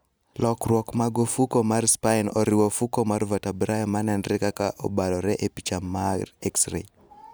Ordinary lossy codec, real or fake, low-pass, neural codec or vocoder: none; real; none; none